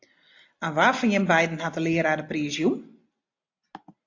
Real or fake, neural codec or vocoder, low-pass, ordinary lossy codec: real; none; 7.2 kHz; AAC, 48 kbps